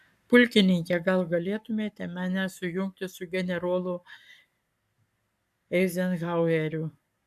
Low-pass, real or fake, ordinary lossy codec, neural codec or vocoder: 14.4 kHz; fake; AAC, 96 kbps; codec, 44.1 kHz, 7.8 kbps, Pupu-Codec